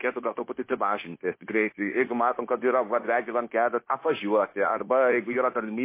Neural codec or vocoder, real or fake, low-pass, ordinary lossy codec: codec, 16 kHz, 0.9 kbps, LongCat-Audio-Codec; fake; 3.6 kHz; MP3, 24 kbps